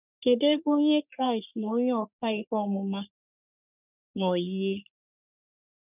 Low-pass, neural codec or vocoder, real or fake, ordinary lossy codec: 3.6 kHz; codec, 44.1 kHz, 3.4 kbps, Pupu-Codec; fake; none